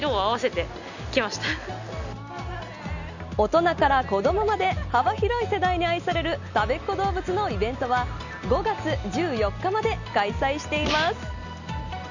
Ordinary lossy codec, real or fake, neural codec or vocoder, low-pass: none; real; none; 7.2 kHz